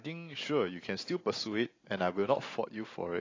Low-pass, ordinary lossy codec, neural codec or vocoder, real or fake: 7.2 kHz; AAC, 32 kbps; none; real